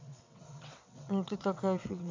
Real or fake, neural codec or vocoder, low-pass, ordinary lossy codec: fake; vocoder, 22.05 kHz, 80 mel bands, WaveNeXt; 7.2 kHz; MP3, 48 kbps